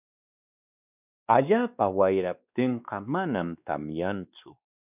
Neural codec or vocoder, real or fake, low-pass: codec, 16 kHz, 2 kbps, X-Codec, WavLM features, trained on Multilingual LibriSpeech; fake; 3.6 kHz